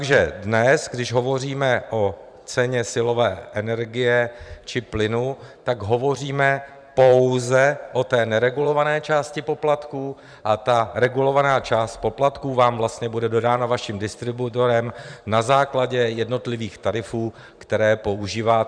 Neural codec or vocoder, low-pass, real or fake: vocoder, 44.1 kHz, 128 mel bands every 512 samples, BigVGAN v2; 9.9 kHz; fake